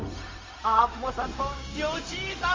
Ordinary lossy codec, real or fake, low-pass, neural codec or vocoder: MP3, 32 kbps; fake; 7.2 kHz; codec, 16 kHz, 0.4 kbps, LongCat-Audio-Codec